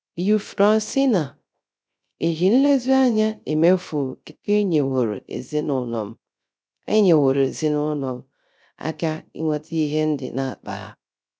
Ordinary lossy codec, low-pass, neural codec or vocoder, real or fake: none; none; codec, 16 kHz, 0.3 kbps, FocalCodec; fake